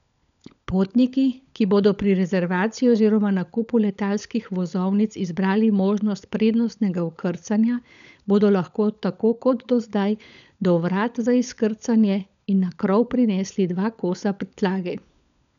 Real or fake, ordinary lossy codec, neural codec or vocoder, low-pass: fake; none; codec, 16 kHz, 16 kbps, FunCodec, trained on LibriTTS, 50 frames a second; 7.2 kHz